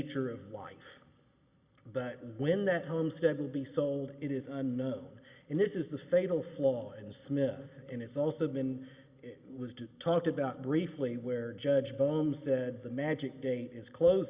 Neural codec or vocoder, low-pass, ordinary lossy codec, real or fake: none; 3.6 kHz; Opus, 64 kbps; real